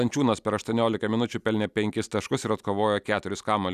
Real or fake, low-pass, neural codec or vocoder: real; 14.4 kHz; none